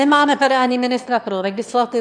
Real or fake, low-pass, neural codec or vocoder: fake; 9.9 kHz; autoencoder, 22.05 kHz, a latent of 192 numbers a frame, VITS, trained on one speaker